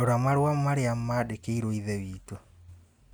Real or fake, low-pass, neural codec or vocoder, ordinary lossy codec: real; none; none; none